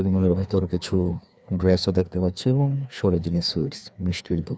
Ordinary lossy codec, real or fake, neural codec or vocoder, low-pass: none; fake; codec, 16 kHz, 2 kbps, FreqCodec, larger model; none